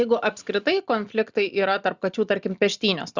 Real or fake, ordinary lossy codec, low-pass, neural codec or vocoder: real; Opus, 64 kbps; 7.2 kHz; none